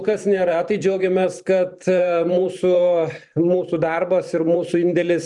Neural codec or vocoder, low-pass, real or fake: vocoder, 44.1 kHz, 128 mel bands every 512 samples, BigVGAN v2; 10.8 kHz; fake